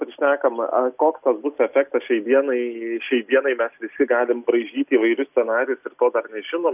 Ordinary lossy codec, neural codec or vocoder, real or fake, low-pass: AAC, 32 kbps; none; real; 3.6 kHz